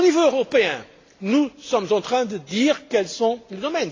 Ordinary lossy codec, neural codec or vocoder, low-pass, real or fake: AAC, 32 kbps; none; 7.2 kHz; real